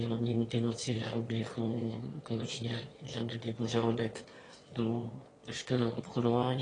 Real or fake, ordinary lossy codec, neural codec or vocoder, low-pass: fake; AAC, 32 kbps; autoencoder, 22.05 kHz, a latent of 192 numbers a frame, VITS, trained on one speaker; 9.9 kHz